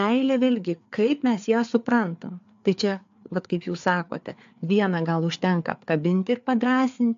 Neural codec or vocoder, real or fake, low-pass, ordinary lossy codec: codec, 16 kHz, 4 kbps, FreqCodec, larger model; fake; 7.2 kHz; AAC, 64 kbps